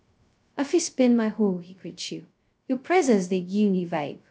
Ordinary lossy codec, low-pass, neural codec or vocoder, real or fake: none; none; codec, 16 kHz, 0.2 kbps, FocalCodec; fake